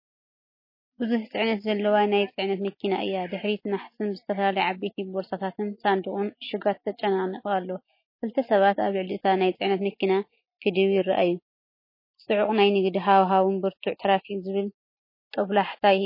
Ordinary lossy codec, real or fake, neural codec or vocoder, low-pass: MP3, 24 kbps; real; none; 5.4 kHz